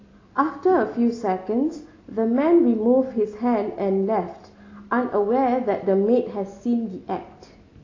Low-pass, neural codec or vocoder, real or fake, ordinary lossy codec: 7.2 kHz; none; real; AAC, 32 kbps